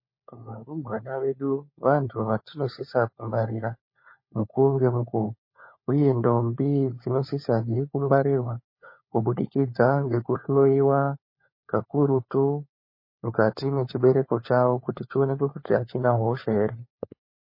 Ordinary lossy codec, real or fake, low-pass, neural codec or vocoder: MP3, 24 kbps; fake; 5.4 kHz; codec, 16 kHz, 4 kbps, FunCodec, trained on LibriTTS, 50 frames a second